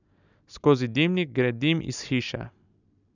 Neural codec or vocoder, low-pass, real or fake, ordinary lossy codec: none; 7.2 kHz; real; none